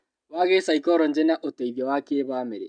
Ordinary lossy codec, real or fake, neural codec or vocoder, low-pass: none; real; none; 9.9 kHz